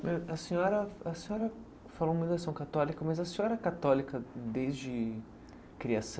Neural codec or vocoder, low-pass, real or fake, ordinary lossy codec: none; none; real; none